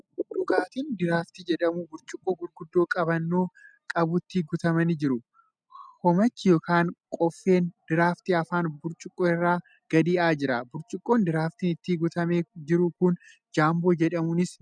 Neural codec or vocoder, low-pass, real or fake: none; 9.9 kHz; real